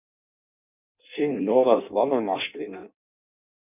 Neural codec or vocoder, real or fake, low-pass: codec, 16 kHz in and 24 kHz out, 0.6 kbps, FireRedTTS-2 codec; fake; 3.6 kHz